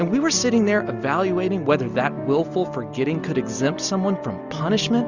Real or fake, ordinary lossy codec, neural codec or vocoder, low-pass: real; Opus, 64 kbps; none; 7.2 kHz